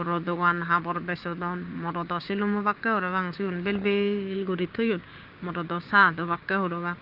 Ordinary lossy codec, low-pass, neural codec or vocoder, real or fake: Opus, 32 kbps; 5.4 kHz; codec, 16 kHz, 6 kbps, DAC; fake